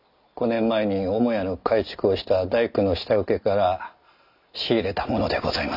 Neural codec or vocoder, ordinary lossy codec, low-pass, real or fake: none; none; 5.4 kHz; real